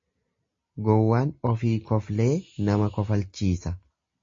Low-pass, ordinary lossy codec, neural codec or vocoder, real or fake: 7.2 kHz; MP3, 32 kbps; none; real